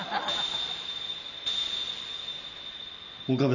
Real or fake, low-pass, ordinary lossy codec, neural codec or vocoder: real; 7.2 kHz; none; none